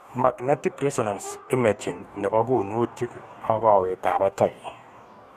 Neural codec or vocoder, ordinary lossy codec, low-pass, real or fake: codec, 44.1 kHz, 2.6 kbps, DAC; none; 14.4 kHz; fake